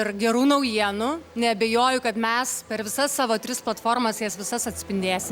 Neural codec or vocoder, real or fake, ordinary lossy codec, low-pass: none; real; Opus, 64 kbps; 19.8 kHz